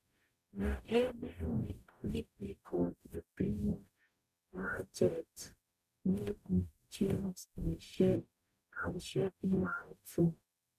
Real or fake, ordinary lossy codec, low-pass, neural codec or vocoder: fake; none; 14.4 kHz; codec, 44.1 kHz, 0.9 kbps, DAC